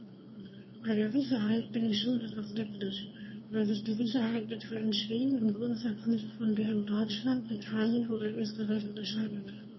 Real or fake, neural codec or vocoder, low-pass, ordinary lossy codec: fake; autoencoder, 22.05 kHz, a latent of 192 numbers a frame, VITS, trained on one speaker; 7.2 kHz; MP3, 24 kbps